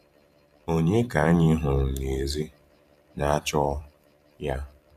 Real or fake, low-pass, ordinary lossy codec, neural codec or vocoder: fake; 14.4 kHz; none; vocoder, 44.1 kHz, 128 mel bands every 512 samples, BigVGAN v2